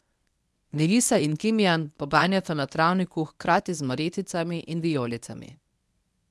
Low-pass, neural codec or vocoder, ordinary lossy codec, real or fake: none; codec, 24 kHz, 0.9 kbps, WavTokenizer, medium speech release version 1; none; fake